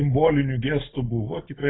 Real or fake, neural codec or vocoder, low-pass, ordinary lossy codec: real; none; 7.2 kHz; AAC, 16 kbps